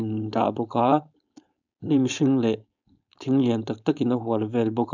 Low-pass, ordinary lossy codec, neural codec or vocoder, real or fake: 7.2 kHz; none; codec, 16 kHz, 4.8 kbps, FACodec; fake